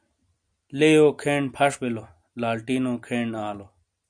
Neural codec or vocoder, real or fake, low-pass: none; real; 9.9 kHz